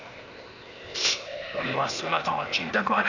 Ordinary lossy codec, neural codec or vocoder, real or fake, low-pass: none; codec, 16 kHz, 0.8 kbps, ZipCodec; fake; 7.2 kHz